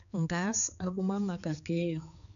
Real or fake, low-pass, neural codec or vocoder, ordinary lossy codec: fake; 7.2 kHz; codec, 16 kHz, 4 kbps, X-Codec, HuBERT features, trained on balanced general audio; none